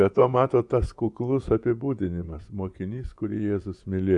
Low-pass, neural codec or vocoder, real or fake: 10.8 kHz; vocoder, 24 kHz, 100 mel bands, Vocos; fake